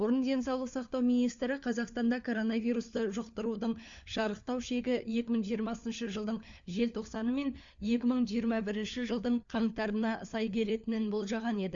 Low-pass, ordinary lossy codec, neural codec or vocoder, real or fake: 7.2 kHz; Opus, 64 kbps; codec, 16 kHz, 4 kbps, FunCodec, trained on LibriTTS, 50 frames a second; fake